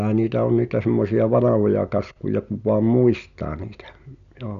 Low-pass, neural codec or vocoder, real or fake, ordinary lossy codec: 7.2 kHz; none; real; none